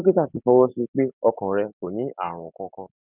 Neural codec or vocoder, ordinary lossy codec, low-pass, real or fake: none; none; 3.6 kHz; real